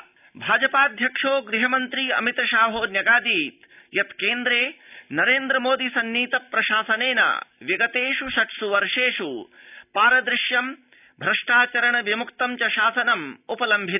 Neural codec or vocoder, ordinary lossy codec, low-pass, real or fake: none; none; 3.6 kHz; real